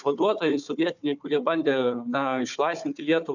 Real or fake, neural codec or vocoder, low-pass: fake; codec, 16 kHz, 4 kbps, FunCodec, trained on Chinese and English, 50 frames a second; 7.2 kHz